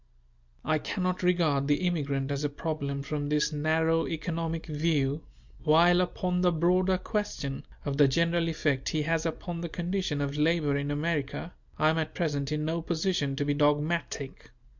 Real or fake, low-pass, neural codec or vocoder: real; 7.2 kHz; none